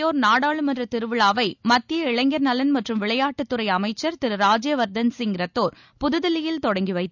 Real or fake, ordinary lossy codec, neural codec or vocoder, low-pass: real; none; none; 7.2 kHz